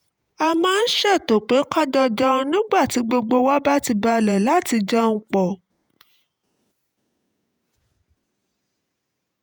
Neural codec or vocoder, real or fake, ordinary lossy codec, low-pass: vocoder, 48 kHz, 128 mel bands, Vocos; fake; none; none